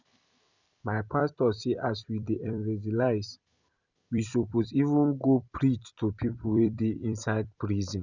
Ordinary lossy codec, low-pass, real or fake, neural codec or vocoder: Opus, 64 kbps; 7.2 kHz; fake; vocoder, 44.1 kHz, 128 mel bands every 256 samples, BigVGAN v2